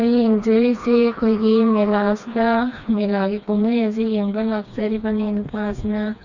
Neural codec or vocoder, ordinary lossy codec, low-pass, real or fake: codec, 16 kHz, 2 kbps, FreqCodec, smaller model; none; 7.2 kHz; fake